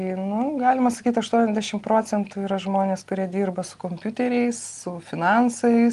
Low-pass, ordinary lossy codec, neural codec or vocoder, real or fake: 10.8 kHz; Opus, 24 kbps; none; real